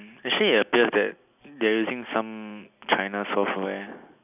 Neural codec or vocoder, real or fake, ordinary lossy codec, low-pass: none; real; none; 3.6 kHz